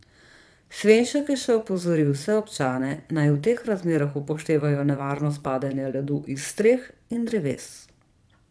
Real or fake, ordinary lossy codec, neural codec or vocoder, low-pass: fake; none; vocoder, 22.05 kHz, 80 mel bands, Vocos; none